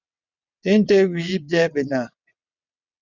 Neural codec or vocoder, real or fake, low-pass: vocoder, 22.05 kHz, 80 mel bands, WaveNeXt; fake; 7.2 kHz